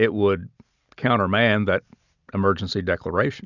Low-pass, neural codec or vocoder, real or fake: 7.2 kHz; none; real